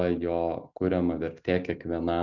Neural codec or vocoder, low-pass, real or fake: none; 7.2 kHz; real